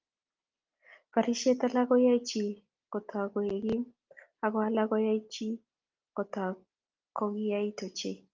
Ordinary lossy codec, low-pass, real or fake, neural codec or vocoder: Opus, 24 kbps; 7.2 kHz; real; none